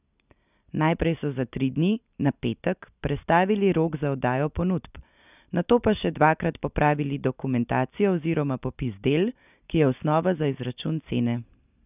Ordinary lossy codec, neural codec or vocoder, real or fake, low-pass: none; none; real; 3.6 kHz